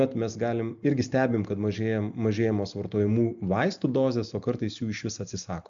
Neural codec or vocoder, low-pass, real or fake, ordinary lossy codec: none; 7.2 kHz; real; AAC, 64 kbps